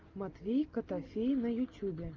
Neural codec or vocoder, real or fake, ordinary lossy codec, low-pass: none; real; Opus, 24 kbps; 7.2 kHz